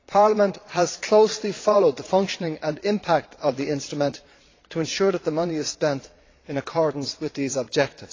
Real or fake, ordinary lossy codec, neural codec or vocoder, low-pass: fake; AAC, 32 kbps; vocoder, 22.05 kHz, 80 mel bands, Vocos; 7.2 kHz